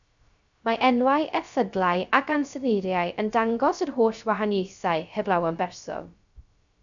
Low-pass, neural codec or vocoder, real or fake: 7.2 kHz; codec, 16 kHz, 0.3 kbps, FocalCodec; fake